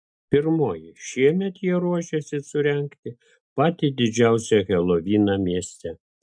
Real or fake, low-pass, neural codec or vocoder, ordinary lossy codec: real; 9.9 kHz; none; MP3, 64 kbps